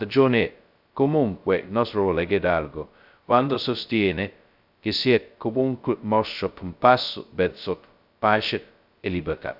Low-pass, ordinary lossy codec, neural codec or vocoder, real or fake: 5.4 kHz; AAC, 48 kbps; codec, 16 kHz, 0.2 kbps, FocalCodec; fake